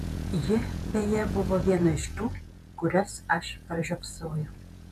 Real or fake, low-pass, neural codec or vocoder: fake; 14.4 kHz; vocoder, 48 kHz, 128 mel bands, Vocos